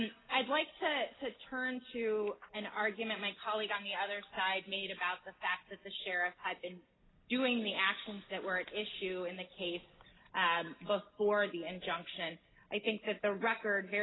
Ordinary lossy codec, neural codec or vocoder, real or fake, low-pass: AAC, 16 kbps; none; real; 7.2 kHz